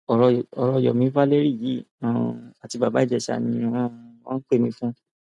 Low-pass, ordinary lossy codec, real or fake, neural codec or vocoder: 10.8 kHz; none; real; none